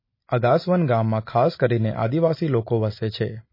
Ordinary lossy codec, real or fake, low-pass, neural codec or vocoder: MP3, 24 kbps; real; 5.4 kHz; none